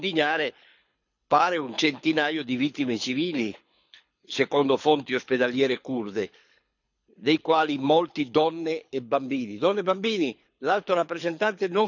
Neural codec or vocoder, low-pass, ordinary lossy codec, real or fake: codec, 24 kHz, 6 kbps, HILCodec; 7.2 kHz; none; fake